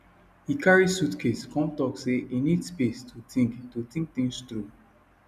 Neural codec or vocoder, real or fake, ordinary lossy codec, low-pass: none; real; none; 14.4 kHz